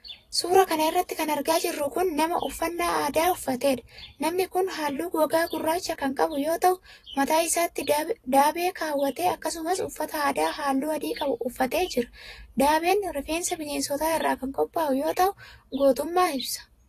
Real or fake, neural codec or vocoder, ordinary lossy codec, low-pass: fake; vocoder, 44.1 kHz, 128 mel bands every 256 samples, BigVGAN v2; AAC, 48 kbps; 14.4 kHz